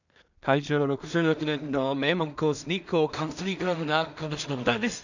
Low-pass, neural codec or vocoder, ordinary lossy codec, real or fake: 7.2 kHz; codec, 16 kHz in and 24 kHz out, 0.4 kbps, LongCat-Audio-Codec, two codebook decoder; none; fake